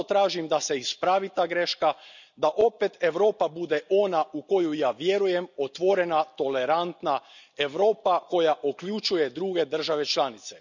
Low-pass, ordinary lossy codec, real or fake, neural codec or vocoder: 7.2 kHz; none; real; none